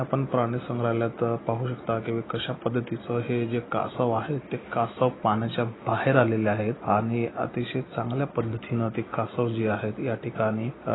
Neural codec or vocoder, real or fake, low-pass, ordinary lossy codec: none; real; 7.2 kHz; AAC, 16 kbps